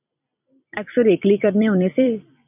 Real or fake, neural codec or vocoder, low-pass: fake; vocoder, 44.1 kHz, 128 mel bands every 256 samples, BigVGAN v2; 3.6 kHz